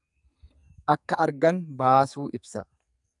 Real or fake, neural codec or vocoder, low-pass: fake; codec, 44.1 kHz, 2.6 kbps, SNAC; 10.8 kHz